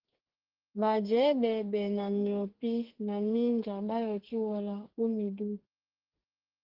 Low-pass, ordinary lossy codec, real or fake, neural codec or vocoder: 5.4 kHz; Opus, 16 kbps; fake; codec, 32 kHz, 1.9 kbps, SNAC